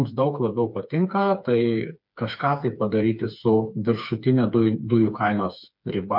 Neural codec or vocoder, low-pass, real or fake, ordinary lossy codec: codec, 16 kHz, 4 kbps, FreqCodec, smaller model; 5.4 kHz; fake; MP3, 48 kbps